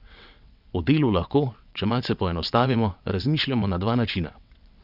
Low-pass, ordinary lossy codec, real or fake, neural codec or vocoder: 5.4 kHz; none; fake; vocoder, 22.05 kHz, 80 mel bands, WaveNeXt